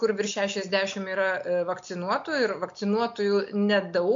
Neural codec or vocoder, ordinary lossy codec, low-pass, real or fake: none; MP3, 48 kbps; 7.2 kHz; real